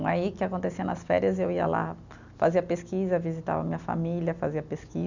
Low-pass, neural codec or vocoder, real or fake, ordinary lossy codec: 7.2 kHz; none; real; none